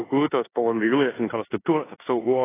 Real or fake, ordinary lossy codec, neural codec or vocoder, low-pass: fake; AAC, 16 kbps; codec, 16 kHz in and 24 kHz out, 0.9 kbps, LongCat-Audio-Codec, four codebook decoder; 3.6 kHz